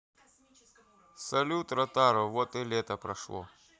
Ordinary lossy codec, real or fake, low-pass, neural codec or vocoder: none; real; none; none